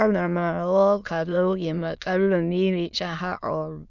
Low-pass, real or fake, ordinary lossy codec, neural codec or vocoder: 7.2 kHz; fake; none; autoencoder, 22.05 kHz, a latent of 192 numbers a frame, VITS, trained on many speakers